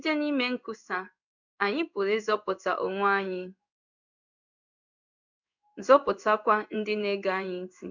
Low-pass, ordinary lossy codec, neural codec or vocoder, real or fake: 7.2 kHz; none; codec, 16 kHz in and 24 kHz out, 1 kbps, XY-Tokenizer; fake